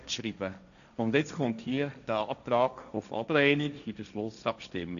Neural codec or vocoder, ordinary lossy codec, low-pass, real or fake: codec, 16 kHz, 1.1 kbps, Voila-Tokenizer; none; 7.2 kHz; fake